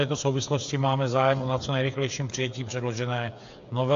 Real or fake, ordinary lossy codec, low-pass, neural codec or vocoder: fake; AAC, 64 kbps; 7.2 kHz; codec, 16 kHz, 8 kbps, FreqCodec, smaller model